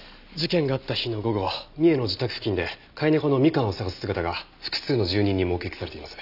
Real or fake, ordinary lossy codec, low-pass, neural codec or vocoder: real; none; 5.4 kHz; none